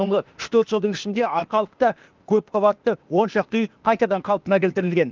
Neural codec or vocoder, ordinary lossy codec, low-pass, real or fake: codec, 16 kHz, 0.8 kbps, ZipCodec; Opus, 24 kbps; 7.2 kHz; fake